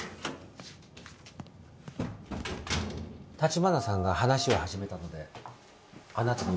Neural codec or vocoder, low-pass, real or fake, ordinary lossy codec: none; none; real; none